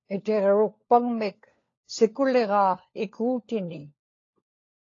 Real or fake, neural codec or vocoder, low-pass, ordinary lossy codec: fake; codec, 16 kHz, 4 kbps, FunCodec, trained on LibriTTS, 50 frames a second; 7.2 kHz; AAC, 32 kbps